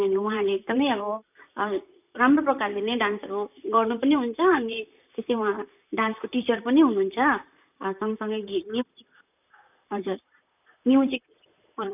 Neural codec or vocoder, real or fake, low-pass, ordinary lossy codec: vocoder, 44.1 kHz, 128 mel bands, Pupu-Vocoder; fake; 3.6 kHz; none